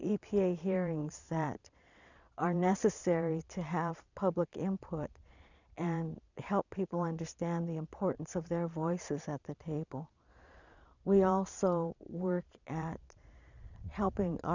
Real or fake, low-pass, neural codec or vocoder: fake; 7.2 kHz; vocoder, 22.05 kHz, 80 mel bands, Vocos